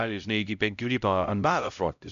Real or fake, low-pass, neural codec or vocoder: fake; 7.2 kHz; codec, 16 kHz, 0.5 kbps, X-Codec, HuBERT features, trained on LibriSpeech